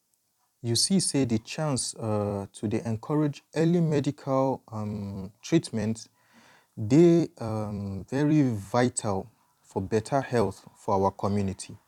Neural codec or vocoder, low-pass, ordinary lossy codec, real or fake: vocoder, 44.1 kHz, 128 mel bands every 256 samples, BigVGAN v2; 19.8 kHz; none; fake